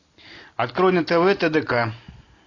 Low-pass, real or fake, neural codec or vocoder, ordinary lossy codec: 7.2 kHz; real; none; AAC, 32 kbps